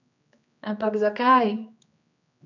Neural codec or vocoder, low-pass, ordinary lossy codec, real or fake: codec, 16 kHz, 2 kbps, X-Codec, HuBERT features, trained on general audio; 7.2 kHz; none; fake